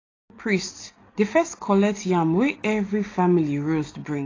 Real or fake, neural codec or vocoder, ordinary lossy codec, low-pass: real; none; AAC, 32 kbps; 7.2 kHz